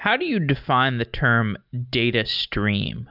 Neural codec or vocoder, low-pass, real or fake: none; 5.4 kHz; real